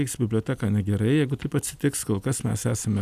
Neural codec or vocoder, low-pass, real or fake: none; 14.4 kHz; real